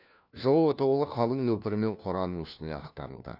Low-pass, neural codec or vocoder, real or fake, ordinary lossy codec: 5.4 kHz; codec, 16 kHz, 1 kbps, FunCodec, trained on LibriTTS, 50 frames a second; fake; none